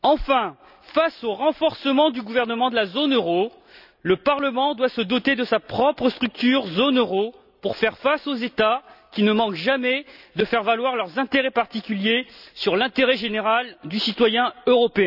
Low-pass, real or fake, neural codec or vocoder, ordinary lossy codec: 5.4 kHz; real; none; none